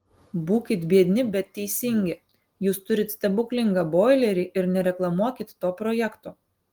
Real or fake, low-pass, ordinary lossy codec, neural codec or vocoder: real; 19.8 kHz; Opus, 24 kbps; none